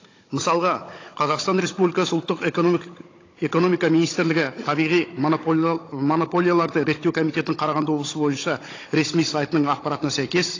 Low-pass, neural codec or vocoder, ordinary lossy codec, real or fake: 7.2 kHz; codec, 16 kHz, 16 kbps, FunCodec, trained on Chinese and English, 50 frames a second; AAC, 32 kbps; fake